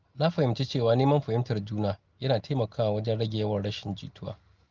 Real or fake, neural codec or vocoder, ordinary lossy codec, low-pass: real; none; Opus, 24 kbps; 7.2 kHz